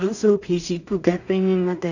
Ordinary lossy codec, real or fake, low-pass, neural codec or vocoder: none; fake; 7.2 kHz; codec, 16 kHz in and 24 kHz out, 0.4 kbps, LongCat-Audio-Codec, two codebook decoder